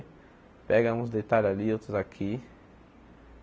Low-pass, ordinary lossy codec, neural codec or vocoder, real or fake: none; none; none; real